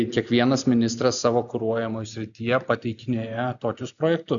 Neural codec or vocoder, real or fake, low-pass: none; real; 7.2 kHz